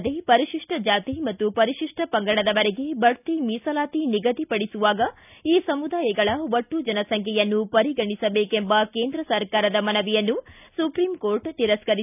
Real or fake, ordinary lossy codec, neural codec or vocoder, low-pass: real; none; none; 3.6 kHz